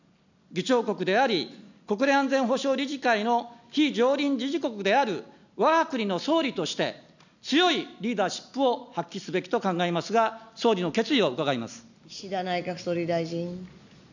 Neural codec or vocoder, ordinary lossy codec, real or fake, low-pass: none; none; real; 7.2 kHz